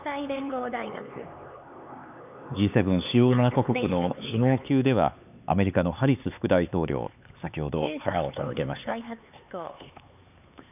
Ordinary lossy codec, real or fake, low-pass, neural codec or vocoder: none; fake; 3.6 kHz; codec, 16 kHz, 4 kbps, X-Codec, HuBERT features, trained on LibriSpeech